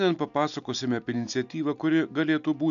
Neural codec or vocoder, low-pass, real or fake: none; 7.2 kHz; real